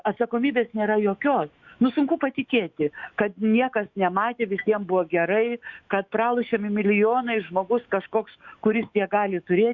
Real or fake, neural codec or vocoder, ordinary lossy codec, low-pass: fake; autoencoder, 48 kHz, 128 numbers a frame, DAC-VAE, trained on Japanese speech; Opus, 64 kbps; 7.2 kHz